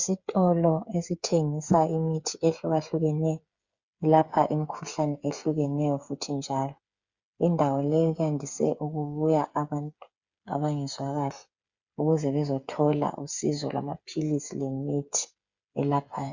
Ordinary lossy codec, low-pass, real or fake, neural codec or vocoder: Opus, 64 kbps; 7.2 kHz; fake; codec, 16 kHz, 8 kbps, FreqCodec, smaller model